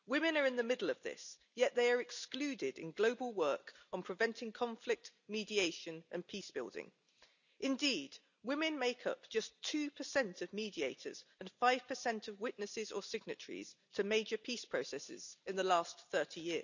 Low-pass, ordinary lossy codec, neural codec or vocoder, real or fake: 7.2 kHz; none; none; real